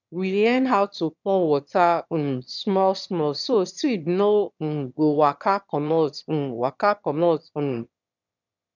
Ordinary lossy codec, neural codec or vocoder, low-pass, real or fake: none; autoencoder, 22.05 kHz, a latent of 192 numbers a frame, VITS, trained on one speaker; 7.2 kHz; fake